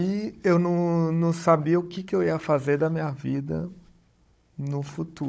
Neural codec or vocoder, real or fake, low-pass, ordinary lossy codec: codec, 16 kHz, 16 kbps, FunCodec, trained on Chinese and English, 50 frames a second; fake; none; none